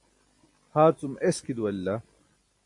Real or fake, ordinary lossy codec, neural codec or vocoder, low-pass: real; MP3, 48 kbps; none; 10.8 kHz